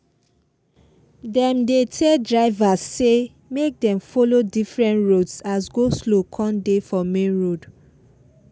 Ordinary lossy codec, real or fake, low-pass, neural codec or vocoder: none; real; none; none